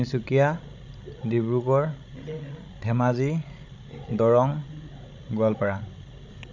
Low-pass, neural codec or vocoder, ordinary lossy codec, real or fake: 7.2 kHz; codec, 16 kHz, 16 kbps, FreqCodec, larger model; none; fake